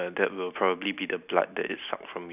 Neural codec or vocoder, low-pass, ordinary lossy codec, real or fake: none; 3.6 kHz; none; real